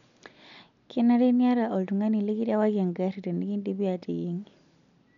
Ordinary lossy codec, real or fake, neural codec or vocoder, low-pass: none; real; none; 7.2 kHz